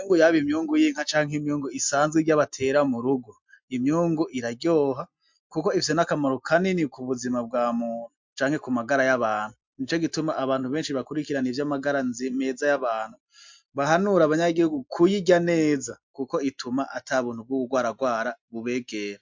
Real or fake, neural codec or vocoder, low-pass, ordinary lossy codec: real; none; 7.2 kHz; MP3, 64 kbps